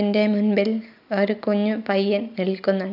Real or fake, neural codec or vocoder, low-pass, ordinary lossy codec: real; none; 5.4 kHz; none